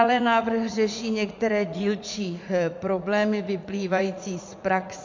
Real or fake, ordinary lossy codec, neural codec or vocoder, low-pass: fake; MP3, 48 kbps; vocoder, 44.1 kHz, 80 mel bands, Vocos; 7.2 kHz